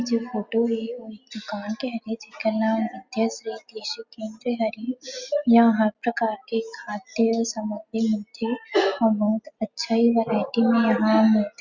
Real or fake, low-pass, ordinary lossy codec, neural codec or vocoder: real; 7.2 kHz; Opus, 64 kbps; none